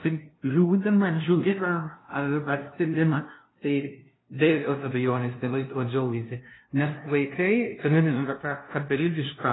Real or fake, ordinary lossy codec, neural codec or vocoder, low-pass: fake; AAC, 16 kbps; codec, 16 kHz, 0.5 kbps, FunCodec, trained on LibriTTS, 25 frames a second; 7.2 kHz